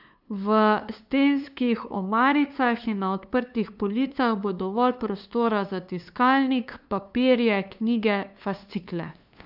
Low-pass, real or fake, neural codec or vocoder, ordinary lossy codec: 5.4 kHz; fake; codec, 16 kHz, 2 kbps, FunCodec, trained on LibriTTS, 25 frames a second; none